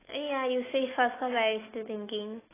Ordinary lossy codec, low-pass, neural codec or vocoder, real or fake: AAC, 16 kbps; 3.6 kHz; autoencoder, 48 kHz, 128 numbers a frame, DAC-VAE, trained on Japanese speech; fake